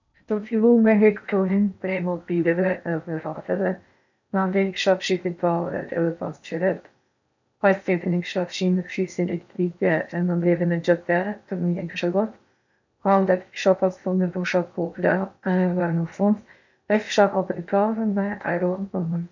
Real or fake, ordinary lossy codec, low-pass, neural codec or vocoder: fake; none; 7.2 kHz; codec, 16 kHz in and 24 kHz out, 0.8 kbps, FocalCodec, streaming, 65536 codes